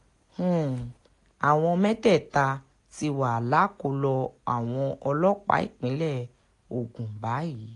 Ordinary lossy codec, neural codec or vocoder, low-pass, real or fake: AAC, 48 kbps; none; 10.8 kHz; real